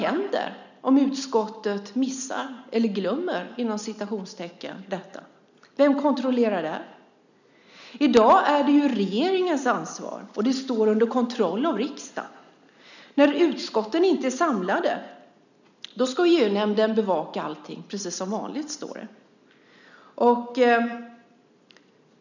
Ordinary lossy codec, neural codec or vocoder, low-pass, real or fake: none; none; 7.2 kHz; real